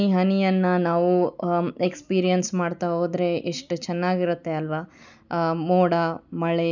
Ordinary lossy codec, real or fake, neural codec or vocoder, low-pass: none; real; none; 7.2 kHz